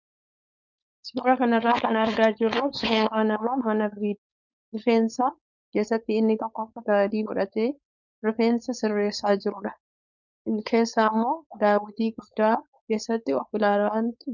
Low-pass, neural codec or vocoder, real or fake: 7.2 kHz; codec, 16 kHz, 4.8 kbps, FACodec; fake